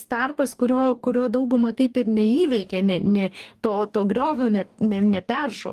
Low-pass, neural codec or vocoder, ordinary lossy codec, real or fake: 14.4 kHz; codec, 44.1 kHz, 2.6 kbps, DAC; Opus, 32 kbps; fake